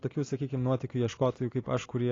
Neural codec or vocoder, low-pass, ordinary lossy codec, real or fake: none; 7.2 kHz; AAC, 32 kbps; real